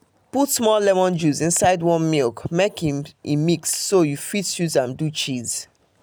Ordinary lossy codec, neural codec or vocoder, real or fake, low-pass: none; none; real; none